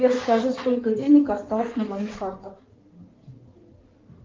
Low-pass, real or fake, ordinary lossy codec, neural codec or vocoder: 7.2 kHz; fake; Opus, 24 kbps; codec, 16 kHz in and 24 kHz out, 2.2 kbps, FireRedTTS-2 codec